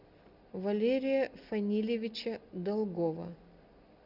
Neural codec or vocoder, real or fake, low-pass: none; real; 5.4 kHz